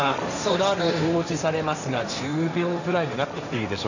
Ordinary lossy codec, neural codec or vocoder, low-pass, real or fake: none; codec, 16 kHz, 1.1 kbps, Voila-Tokenizer; 7.2 kHz; fake